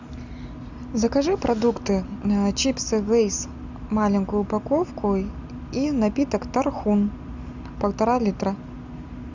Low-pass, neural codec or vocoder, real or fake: 7.2 kHz; none; real